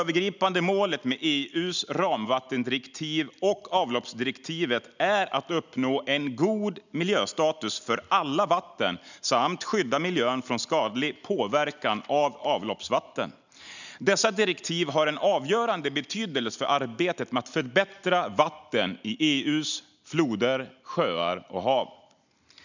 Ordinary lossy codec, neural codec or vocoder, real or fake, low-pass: none; none; real; 7.2 kHz